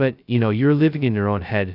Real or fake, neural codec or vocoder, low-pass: fake; codec, 16 kHz, 0.2 kbps, FocalCodec; 5.4 kHz